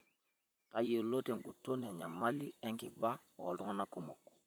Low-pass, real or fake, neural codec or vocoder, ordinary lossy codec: none; fake; vocoder, 44.1 kHz, 128 mel bands, Pupu-Vocoder; none